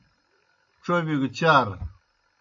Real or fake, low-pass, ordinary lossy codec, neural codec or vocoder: real; 7.2 kHz; AAC, 48 kbps; none